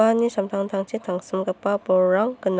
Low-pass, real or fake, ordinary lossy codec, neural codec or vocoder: none; real; none; none